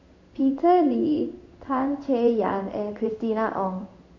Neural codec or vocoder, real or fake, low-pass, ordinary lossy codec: codec, 16 kHz in and 24 kHz out, 1 kbps, XY-Tokenizer; fake; 7.2 kHz; MP3, 48 kbps